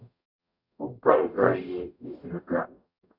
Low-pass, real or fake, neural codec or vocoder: 5.4 kHz; fake; codec, 44.1 kHz, 0.9 kbps, DAC